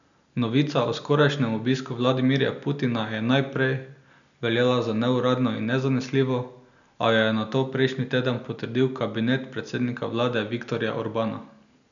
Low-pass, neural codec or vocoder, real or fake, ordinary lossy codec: 7.2 kHz; none; real; none